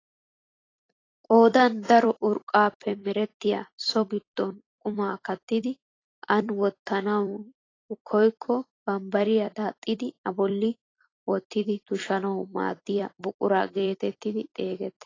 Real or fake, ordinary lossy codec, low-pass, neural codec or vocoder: fake; AAC, 32 kbps; 7.2 kHz; vocoder, 44.1 kHz, 128 mel bands every 256 samples, BigVGAN v2